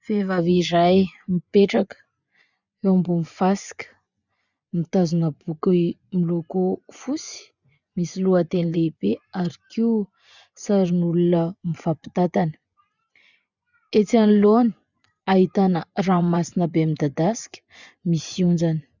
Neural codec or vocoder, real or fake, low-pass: vocoder, 24 kHz, 100 mel bands, Vocos; fake; 7.2 kHz